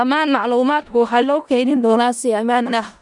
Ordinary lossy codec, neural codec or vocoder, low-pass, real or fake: none; codec, 16 kHz in and 24 kHz out, 0.4 kbps, LongCat-Audio-Codec, four codebook decoder; 10.8 kHz; fake